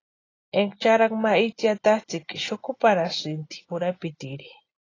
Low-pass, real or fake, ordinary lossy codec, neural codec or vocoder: 7.2 kHz; real; AAC, 32 kbps; none